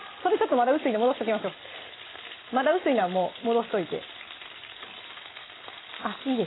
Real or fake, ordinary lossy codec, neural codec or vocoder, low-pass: real; AAC, 16 kbps; none; 7.2 kHz